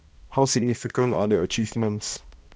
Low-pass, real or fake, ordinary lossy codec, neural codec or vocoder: none; fake; none; codec, 16 kHz, 1 kbps, X-Codec, HuBERT features, trained on balanced general audio